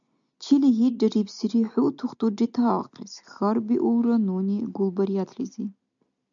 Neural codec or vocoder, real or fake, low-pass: none; real; 7.2 kHz